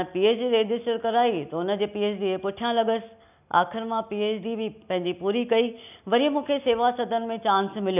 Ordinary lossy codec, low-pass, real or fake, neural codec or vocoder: none; 3.6 kHz; real; none